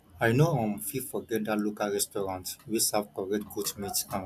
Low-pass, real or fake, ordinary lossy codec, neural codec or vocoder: 14.4 kHz; real; none; none